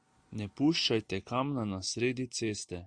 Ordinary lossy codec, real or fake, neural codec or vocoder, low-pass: Opus, 64 kbps; real; none; 9.9 kHz